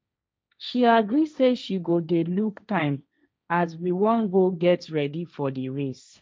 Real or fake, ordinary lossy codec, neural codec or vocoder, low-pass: fake; none; codec, 16 kHz, 1.1 kbps, Voila-Tokenizer; none